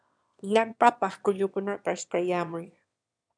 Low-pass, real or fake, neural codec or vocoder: 9.9 kHz; fake; autoencoder, 22.05 kHz, a latent of 192 numbers a frame, VITS, trained on one speaker